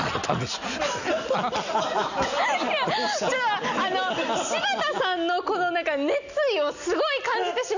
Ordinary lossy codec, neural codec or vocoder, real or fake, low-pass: none; none; real; 7.2 kHz